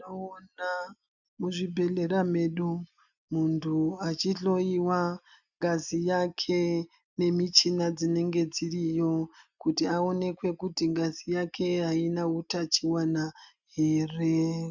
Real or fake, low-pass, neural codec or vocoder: real; 7.2 kHz; none